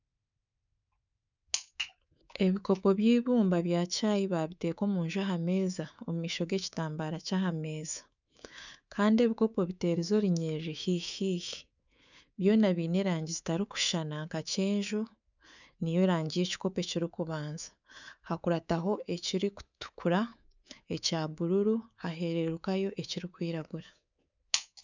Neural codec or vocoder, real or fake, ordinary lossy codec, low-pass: codec, 24 kHz, 3.1 kbps, DualCodec; fake; none; 7.2 kHz